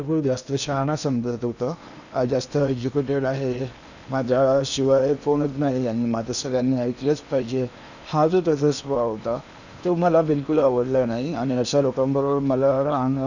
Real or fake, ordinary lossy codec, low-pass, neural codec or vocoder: fake; none; 7.2 kHz; codec, 16 kHz in and 24 kHz out, 0.8 kbps, FocalCodec, streaming, 65536 codes